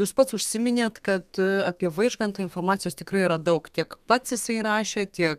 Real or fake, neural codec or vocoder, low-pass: fake; codec, 32 kHz, 1.9 kbps, SNAC; 14.4 kHz